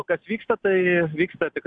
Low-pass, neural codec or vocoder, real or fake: 9.9 kHz; none; real